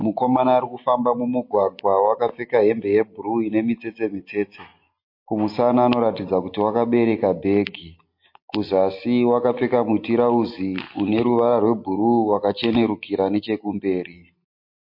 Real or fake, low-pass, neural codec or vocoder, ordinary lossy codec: real; 5.4 kHz; none; MP3, 32 kbps